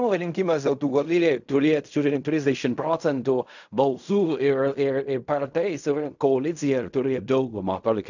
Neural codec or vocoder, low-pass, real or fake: codec, 16 kHz in and 24 kHz out, 0.4 kbps, LongCat-Audio-Codec, fine tuned four codebook decoder; 7.2 kHz; fake